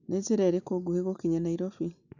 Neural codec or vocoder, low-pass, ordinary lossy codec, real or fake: none; 7.2 kHz; none; real